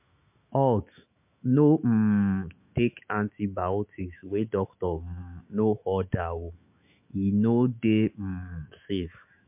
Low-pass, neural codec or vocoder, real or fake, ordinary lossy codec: 3.6 kHz; autoencoder, 48 kHz, 128 numbers a frame, DAC-VAE, trained on Japanese speech; fake; MP3, 32 kbps